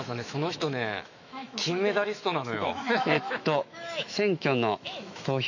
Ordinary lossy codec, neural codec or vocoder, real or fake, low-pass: none; autoencoder, 48 kHz, 128 numbers a frame, DAC-VAE, trained on Japanese speech; fake; 7.2 kHz